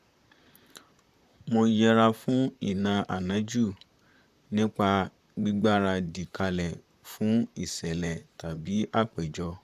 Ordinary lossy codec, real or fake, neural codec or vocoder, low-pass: none; fake; vocoder, 44.1 kHz, 128 mel bands, Pupu-Vocoder; 14.4 kHz